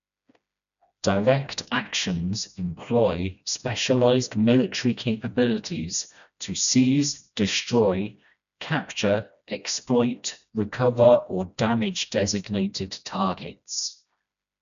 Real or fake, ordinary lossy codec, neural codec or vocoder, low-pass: fake; none; codec, 16 kHz, 1 kbps, FreqCodec, smaller model; 7.2 kHz